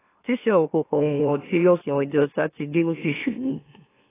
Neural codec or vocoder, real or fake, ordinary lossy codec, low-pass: autoencoder, 44.1 kHz, a latent of 192 numbers a frame, MeloTTS; fake; AAC, 16 kbps; 3.6 kHz